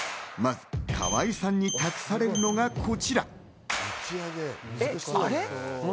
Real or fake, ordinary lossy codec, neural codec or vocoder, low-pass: real; none; none; none